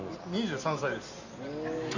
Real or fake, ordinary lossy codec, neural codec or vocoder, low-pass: real; none; none; 7.2 kHz